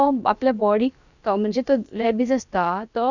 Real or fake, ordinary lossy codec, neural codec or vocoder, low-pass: fake; none; codec, 16 kHz, about 1 kbps, DyCAST, with the encoder's durations; 7.2 kHz